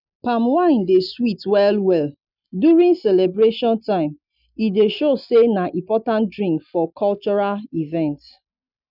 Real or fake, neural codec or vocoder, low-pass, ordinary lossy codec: real; none; 5.4 kHz; none